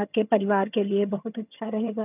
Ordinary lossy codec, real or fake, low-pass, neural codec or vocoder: none; fake; 3.6 kHz; vocoder, 22.05 kHz, 80 mel bands, HiFi-GAN